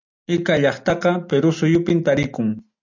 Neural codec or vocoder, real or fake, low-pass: none; real; 7.2 kHz